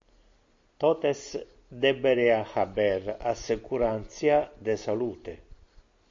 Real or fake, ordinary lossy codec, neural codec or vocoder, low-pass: real; AAC, 48 kbps; none; 7.2 kHz